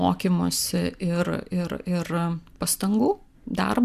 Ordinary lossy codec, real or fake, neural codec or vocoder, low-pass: Opus, 64 kbps; real; none; 14.4 kHz